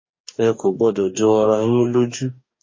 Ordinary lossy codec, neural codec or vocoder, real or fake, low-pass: MP3, 32 kbps; codec, 44.1 kHz, 2.6 kbps, DAC; fake; 7.2 kHz